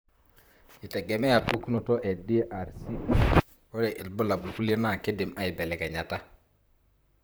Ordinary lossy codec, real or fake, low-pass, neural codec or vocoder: none; fake; none; vocoder, 44.1 kHz, 128 mel bands, Pupu-Vocoder